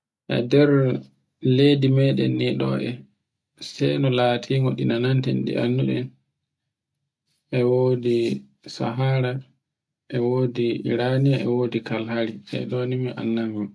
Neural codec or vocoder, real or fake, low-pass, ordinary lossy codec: none; real; none; none